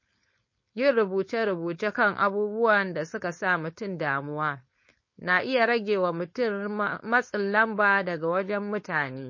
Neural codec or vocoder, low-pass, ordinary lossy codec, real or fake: codec, 16 kHz, 4.8 kbps, FACodec; 7.2 kHz; MP3, 32 kbps; fake